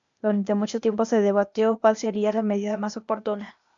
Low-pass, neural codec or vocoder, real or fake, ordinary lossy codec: 7.2 kHz; codec, 16 kHz, 0.8 kbps, ZipCodec; fake; MP3, 64 kbps